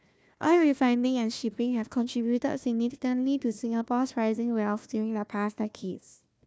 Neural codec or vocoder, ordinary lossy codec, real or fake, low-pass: codec, 16 kHz, 1 kbps, FunCodec, trained on Chinese and English, 50 frames a second; none; fake; none